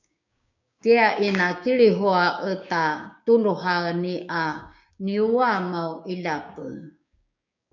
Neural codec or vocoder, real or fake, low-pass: codec, 16 kHz, 6 kbps, DAC; fake; 7.2 kHz